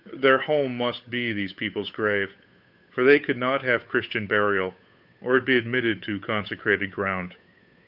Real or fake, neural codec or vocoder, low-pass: fake; codec, 16 kHz, 8 kbps, FunCodec, trained on Chinese and English, 25 frames a second; 5.4 kHz